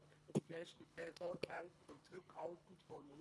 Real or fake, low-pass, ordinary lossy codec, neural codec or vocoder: fake; none; none; codec, 24 kHz, 1.5 kbps, HILCodec